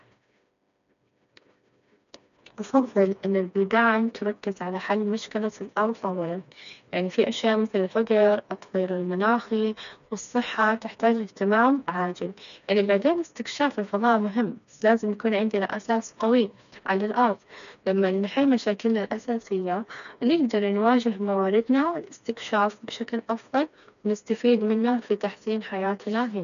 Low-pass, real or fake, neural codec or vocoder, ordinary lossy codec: 7.2 kHz; fake; codec, 16 kHz, 2 kbps, FreqCodec, smaller model; none